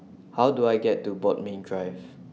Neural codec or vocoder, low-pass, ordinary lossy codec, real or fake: none; none; none; real